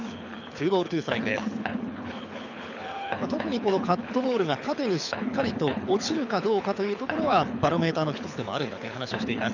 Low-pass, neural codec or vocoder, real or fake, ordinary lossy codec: 7.2 kHz; codec, 24 kHz, 6 kbps, HILCodec; fake; none